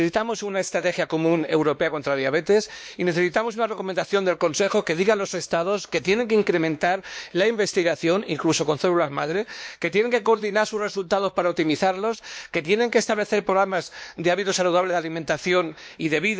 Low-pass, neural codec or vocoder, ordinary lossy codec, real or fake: none; codec, 16 kHz, 2 kbps, X-Codec, WavLM features, trained on Multilingual LibriSpeech; none; fake